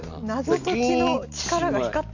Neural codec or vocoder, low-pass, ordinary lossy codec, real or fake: none; 7.2 kHz; none; real